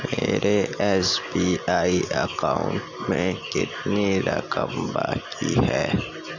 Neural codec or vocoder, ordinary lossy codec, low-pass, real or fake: none; none; 7.2 kHz; real